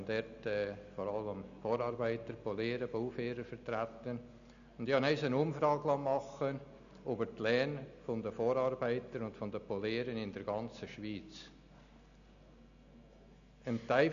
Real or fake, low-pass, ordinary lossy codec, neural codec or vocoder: real; 7.2 kHz; none; none